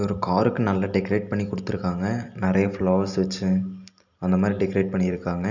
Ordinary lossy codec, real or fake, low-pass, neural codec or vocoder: none; real; 7.2 kHz; none